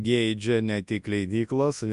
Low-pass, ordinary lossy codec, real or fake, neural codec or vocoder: 10.8 kHz; Opus, 64 kbps; fake; codec, 24 kHz, 0.9 kbps, WavTokenizer, large speech release